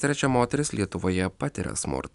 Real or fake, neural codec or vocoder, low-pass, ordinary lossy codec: real; none; 10.8 kHz; MP3, 96 kbps